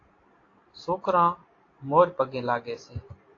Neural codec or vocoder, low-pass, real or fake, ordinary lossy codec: none; 7.2 kHz; real; AAC, 32 kbps